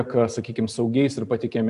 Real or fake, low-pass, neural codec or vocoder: real; 10.8 kHz; none